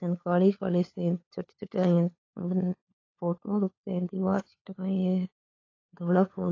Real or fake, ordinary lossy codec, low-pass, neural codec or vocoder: fake; AAC, 32 kbps; 7.2 kHz; codec, 16 kHz, 4 kbps, FunCodec, trained on LibriTTS, 50 frames a second